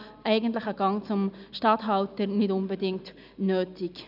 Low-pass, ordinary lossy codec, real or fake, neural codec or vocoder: 5.4 kHz; none; real; none